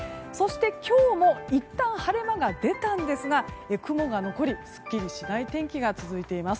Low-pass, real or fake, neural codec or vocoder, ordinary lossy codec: none; real; none; none